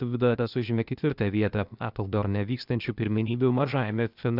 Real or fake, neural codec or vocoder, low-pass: fake; codec, 16 kHz, 0.8 kbps, ZipCodec; 5.4 kHz